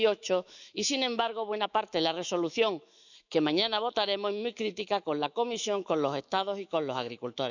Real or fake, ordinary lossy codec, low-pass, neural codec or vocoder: fake; none; 7.2 kHz; autoencoder, 48 kHz, 128 numbers a frame, DAC-VAE, trained on Japanese speech